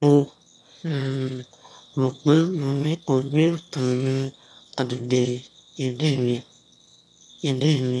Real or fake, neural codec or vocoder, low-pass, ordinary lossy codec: fake; autoencoder, 22.05 kHz, a latent of 192 numbers a frame, VITS, trained on one speaker; none; none